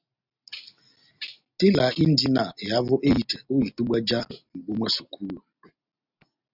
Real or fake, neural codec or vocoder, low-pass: real; none; 5.4 kHz